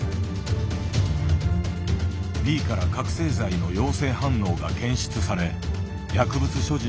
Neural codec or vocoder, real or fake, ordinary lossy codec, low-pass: none; real; none; none